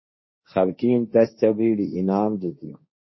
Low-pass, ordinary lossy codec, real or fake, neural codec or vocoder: 7.2 kHz; MP3, 24 kbps; fake; codec, 16 kHz, 1.1 kbps, Voila-Tokenizer